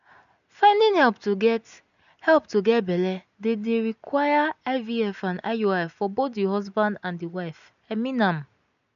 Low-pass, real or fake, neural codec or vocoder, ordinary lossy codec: 7.2 kHz; real; none; none